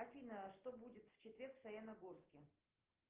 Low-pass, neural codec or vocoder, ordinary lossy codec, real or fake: 3.6 kHz; none; Opus, 16 kbps; real